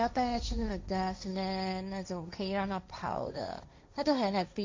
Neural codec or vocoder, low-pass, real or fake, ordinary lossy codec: codec, 16 kHz, 1.1 kbps, Voila-Tokenizer; 7.2 kHz; fake; MP3, 48 kbps